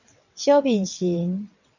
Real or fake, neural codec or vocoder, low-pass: fake; vocoder, 22.05 kHz, 80 mel bands, WaveNeXt; 7.2 kHz